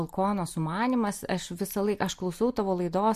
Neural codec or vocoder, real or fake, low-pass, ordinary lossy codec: none; real; 14.4 kHz; MP3, 64 kbps